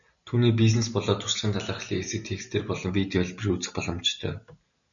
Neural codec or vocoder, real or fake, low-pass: none; real; 7.2 kHz